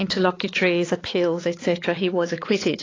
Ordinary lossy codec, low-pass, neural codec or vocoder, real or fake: AAC, 32 kbps; 7.2 kHz; codec, 16 kHz, 4 kbps, X-Codec, HuBERT features, trained on balanced general audio; fake